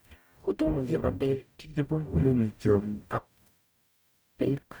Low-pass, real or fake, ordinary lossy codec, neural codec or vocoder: none; fake; none; codec, 44.1 kHz, 0.9 kbps, DAC